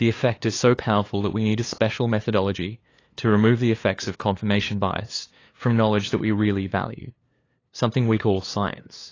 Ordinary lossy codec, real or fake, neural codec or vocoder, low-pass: AAC, 32 kbps; fake; codec, 16 kHz, 2 kbps, FunCodec, trained on LibriTTS, 25 frames a second; 7.2 kHz